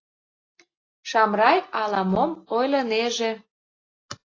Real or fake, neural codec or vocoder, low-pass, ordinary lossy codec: real; none; 7.2 kHz; AAC, 32 kbps